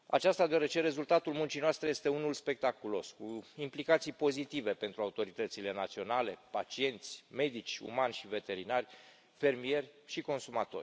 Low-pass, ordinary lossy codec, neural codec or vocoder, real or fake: none; none; none; real